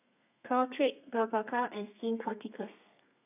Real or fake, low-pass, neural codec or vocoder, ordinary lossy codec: fake; 3.6 kHz; codec, 32 kHz, 1.9 kbps, SNAC; none